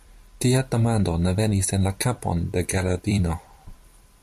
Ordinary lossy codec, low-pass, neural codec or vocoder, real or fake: MP3, 64 kbps; 14.4 kHz; vocoder, 44.1 kHz, 128 mel bands every 256 samples, BigVGAN v2; fake